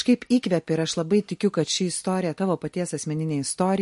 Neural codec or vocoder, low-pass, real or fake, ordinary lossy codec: none; 14.4 kHz; real; MP3, 48 kbps